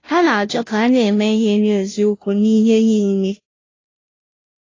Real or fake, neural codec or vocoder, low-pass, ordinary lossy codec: fake; codec, 16 kHz, 0.5 kbps, FunCodec, trained on Chinese and English, 25 frames a second; 7.2 kHz; AAC, 32 kbps